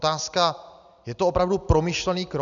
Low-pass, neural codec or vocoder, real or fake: 7.2 kHz; none; real